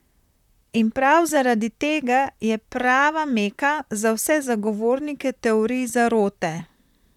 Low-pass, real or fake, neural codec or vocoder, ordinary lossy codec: 19.8 kHz; fake; vocoder, 44.1 kHz, 128 mel bands, Pupu-Vocoder; none